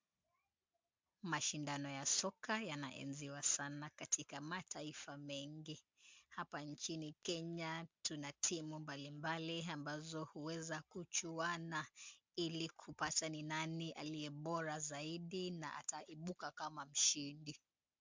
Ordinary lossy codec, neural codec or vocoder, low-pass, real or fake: MP3, 64 kbps; none; 7.2 kHz; real